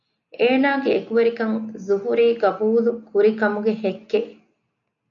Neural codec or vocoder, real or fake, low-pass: none; real; 7.2 kHz